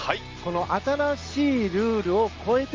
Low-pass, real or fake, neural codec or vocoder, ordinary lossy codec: 7.2 kHz; real; none; Opus, 24 kbps